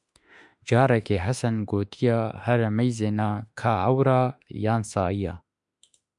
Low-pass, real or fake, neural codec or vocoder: 10.8 kHz; fake; autoencoder, 48 kHz, 32 numbers a frame, DAC-VAE, trained on Japanese speech